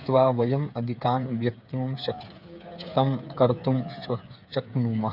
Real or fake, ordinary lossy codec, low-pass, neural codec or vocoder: fake; none; 5.4 kHz; codec, 16 kHz, 8 kbps, FreqCodec, smaller model